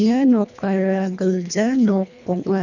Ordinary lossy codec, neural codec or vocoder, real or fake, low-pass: none; codec, 24 kHz, 1.5 kbps, HILCodec; fake; 7.2 kHz